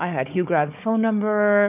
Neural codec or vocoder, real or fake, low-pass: codec, 16 kHz, 4 kbps, FunCodec, trained on Chinese and English, 50 frames a second; fake; 3.6 kHz